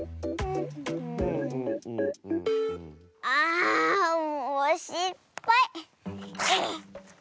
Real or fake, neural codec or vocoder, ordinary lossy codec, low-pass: real; none; none; none